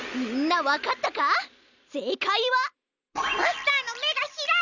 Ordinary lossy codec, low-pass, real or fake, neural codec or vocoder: none; 7.2 kHz; real; none